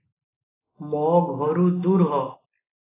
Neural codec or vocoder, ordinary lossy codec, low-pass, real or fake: none; AAC, 16 kbps; 3.6 kHz; real